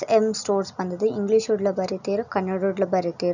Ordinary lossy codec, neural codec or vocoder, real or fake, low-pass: none; none; real; 7.2 kHz